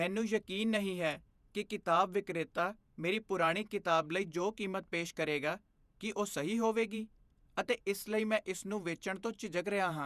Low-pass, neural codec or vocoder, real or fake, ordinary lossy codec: 14.4 kHz; vocoder, 48 kHz, 128 mel bands, Vocos; fake; none